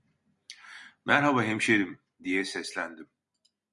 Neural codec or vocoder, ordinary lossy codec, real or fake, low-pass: none; Opus, 64 kbps; real; 10.8 kHz